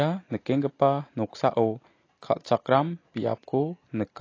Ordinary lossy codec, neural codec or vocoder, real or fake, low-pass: AAC, 32 kbps; none; real; 7.2 kHz